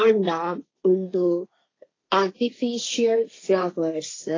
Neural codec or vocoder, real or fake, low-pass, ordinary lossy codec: codec, 16 kHz, 1.1 kbps, Voila-Tokenizer; fake; 7.2 kHz; AAC, 32 kbps